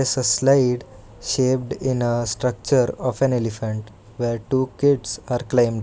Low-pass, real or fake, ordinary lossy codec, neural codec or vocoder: none; real; none; none